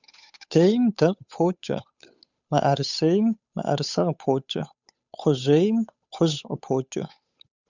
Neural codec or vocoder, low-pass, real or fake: codec, 16 kHz, 8 kbps, FunCodec, trained on Chinese and English, 25 frames a second; 7.2 kHz; fake